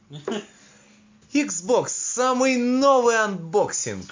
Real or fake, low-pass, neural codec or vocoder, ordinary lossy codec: real; 7.2 kHz; none; MP3, 48 kbps